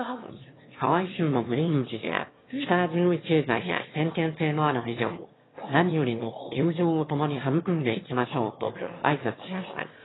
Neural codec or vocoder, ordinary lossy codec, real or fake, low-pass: autoencoder, 22.05 kHz, a latent of 192 numbers a frame, VITS, trained on one speaker; AAC, 16 kbps; fake; 7.2 kHz